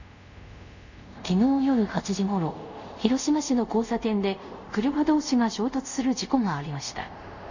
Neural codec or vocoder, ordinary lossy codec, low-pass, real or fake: codec, 24 kHz, 0.5 kbps, DualCodec; none; 7.2 kHz; fake